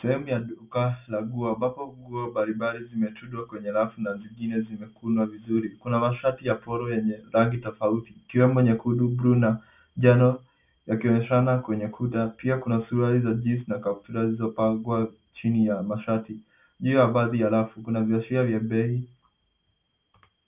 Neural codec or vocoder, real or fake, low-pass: none; real; 3.6 kHz